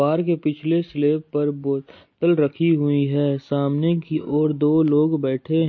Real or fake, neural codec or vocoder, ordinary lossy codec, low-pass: real; none; MP3, 32 kbps; 7.2 kHz